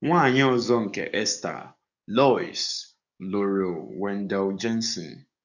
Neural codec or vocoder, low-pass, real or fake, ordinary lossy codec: codec, 44.1 kHz, 7.8 kbps, DAC; 7.2 kHz; fake; none